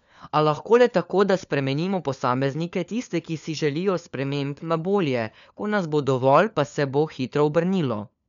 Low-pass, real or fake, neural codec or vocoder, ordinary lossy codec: 7.2 kHz; fake; codec, 16 kHz, 4 kbps, FunCodec, trained on LibriTTS, 50 frames a second; none